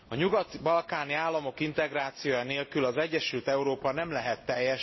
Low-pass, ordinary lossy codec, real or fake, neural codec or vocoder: 7.2 kHz; MP3, 24 kbps; real; none